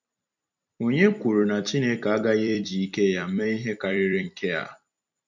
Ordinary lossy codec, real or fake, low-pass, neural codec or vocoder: none; fake; 7.2 kHz; vocoder, 44.1 kHz, 128 mel bands every 256 samples, BigVGAN v2